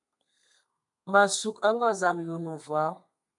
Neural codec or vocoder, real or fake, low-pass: codec, 32 kHz, 1.9 kbps, SNAC; fake; 10.8 kHz